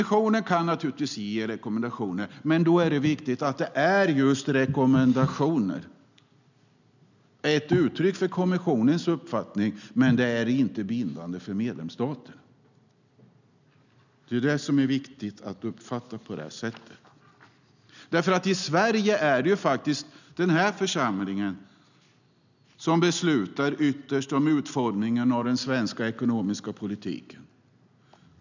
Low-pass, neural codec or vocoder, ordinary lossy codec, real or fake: 7.2 kHz; none; none; real